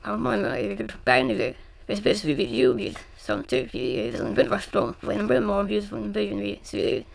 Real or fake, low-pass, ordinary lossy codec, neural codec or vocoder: fake; none; none; autoencoder, 22.05 kHz, a latent of 192 numbers a frame, VITS, trained on many speakers